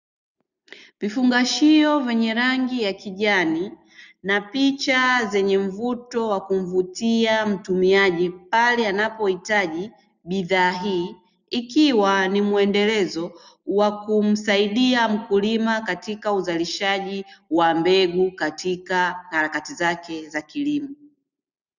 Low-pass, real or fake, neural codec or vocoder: 7.2 kHz; real; none